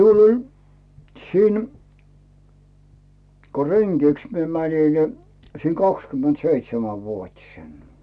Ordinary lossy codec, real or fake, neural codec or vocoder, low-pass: none; real; none; none